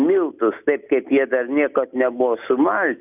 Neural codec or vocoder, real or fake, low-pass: none; real; 3.6 kHz